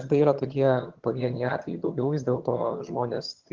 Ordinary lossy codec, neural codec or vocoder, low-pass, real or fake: Opus, 16 kbps; vocoder, 22.05 kHz, 80 mel bands, HiFi-GAN; 7.2 kHz; fake